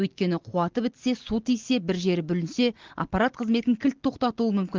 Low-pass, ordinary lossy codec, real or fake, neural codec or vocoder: 7.2 kHz; Opus, 16 kbps; real; none